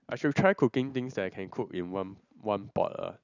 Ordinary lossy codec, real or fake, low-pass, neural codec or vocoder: none; real; 7.2 kHz; none